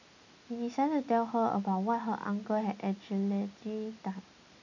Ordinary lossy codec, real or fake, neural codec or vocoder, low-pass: none; real; none; 7.2 kHz